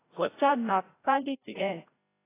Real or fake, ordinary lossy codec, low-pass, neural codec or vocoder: fake; AAC, 16 kbps; 3.6 kHz; codec, 16 kHz, 0.5 kbps, FreqCodec, larger model